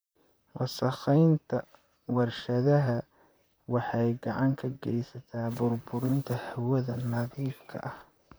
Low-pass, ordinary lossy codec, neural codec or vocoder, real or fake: none; none; vocoder, 44.1 kHz, 128 mel bands, Pupu-Vocoder; fake